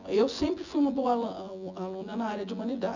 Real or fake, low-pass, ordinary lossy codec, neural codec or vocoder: fake; 7.2 kHz; none; vocoder, 24 kHz, 100 mel bands, Vocos